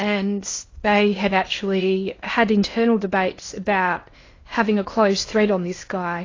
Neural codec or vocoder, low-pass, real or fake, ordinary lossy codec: codec, 16 kHz in and 24 kHz out, 0.8 kbps, FocalCodec, streaming, 65536 codes; 7.2 kHz; fake; AAC, 32 kbps